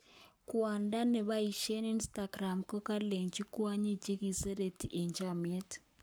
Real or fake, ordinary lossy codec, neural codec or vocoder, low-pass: fake; none; codec, 44.1 kHz, 7.8 kbps, Pupu-Codec; none